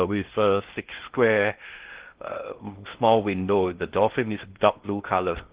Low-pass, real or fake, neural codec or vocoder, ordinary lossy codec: 3.6 kHz; fake; codec, 16 kHz in and 24 kHz out, 0.6 kbps, FocalCodec, streaming, 2048 codes; Opus, 16 kbps